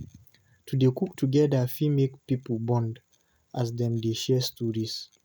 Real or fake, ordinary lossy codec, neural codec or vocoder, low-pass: real; none; none; none